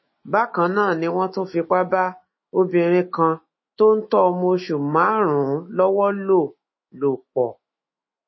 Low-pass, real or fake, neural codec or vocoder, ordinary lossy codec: 7.2 kHz; fake; autoencoder, 48 kHz, 128 numbers a frame, DAC-VAE, trained on Japanese speech; MP3, 24 kbps